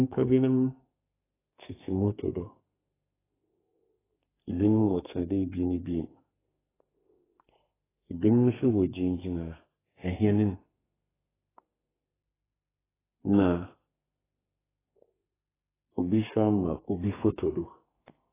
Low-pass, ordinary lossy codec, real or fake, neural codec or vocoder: 3.6 kHz; AAC, 16 kbps; fake; codec, 32 kHz, 1.9 kbps, SNAC